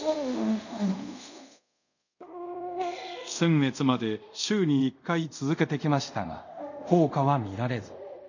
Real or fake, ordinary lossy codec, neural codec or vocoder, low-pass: fake; none; codec, 24 kHz, 0.5 kbps, DualCodec; 7.2 kHz